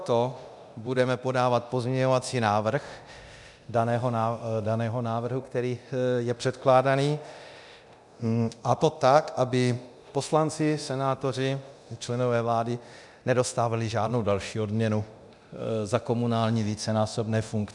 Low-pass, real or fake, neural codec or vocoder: 10.8 kHz; fake; codec, 24 kHz, 0.9 kbps, DualCodec